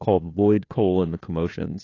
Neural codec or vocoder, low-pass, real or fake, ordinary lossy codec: codec, 16 kHz, 2 kbps, FunCodec, trained on Chinese and English, 25 frames a second; 7.2 kHz; fake; AAC, 32 kbps